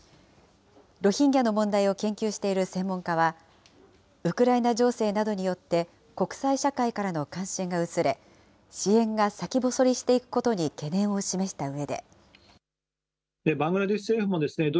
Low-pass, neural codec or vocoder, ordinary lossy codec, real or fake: none; none; none; real